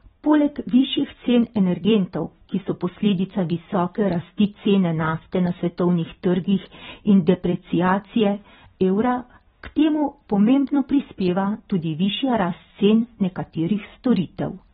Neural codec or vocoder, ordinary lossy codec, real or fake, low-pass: vocoder, 44.1 kHz, 128 mel bands every 256 samples, BigVGAN v2; AAC, 16 kbps; fake; 19.8 kHz